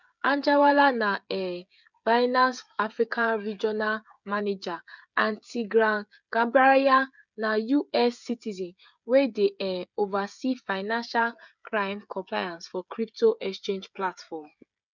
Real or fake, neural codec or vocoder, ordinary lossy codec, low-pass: fake; codec, 16 kHz, 16 kbps, FreqCodec, smaller model; none; 7.2 kHz